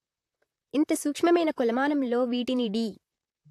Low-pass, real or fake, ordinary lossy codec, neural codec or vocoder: 14.4 kHz; fake; AAC, 64 kbps; vocoder, 44.1 kHz, 128 mel bands, Pupu-Vocoder